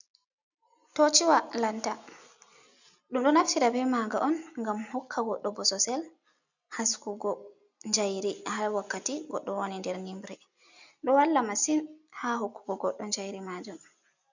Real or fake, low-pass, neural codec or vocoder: real; 7.2 kHz; none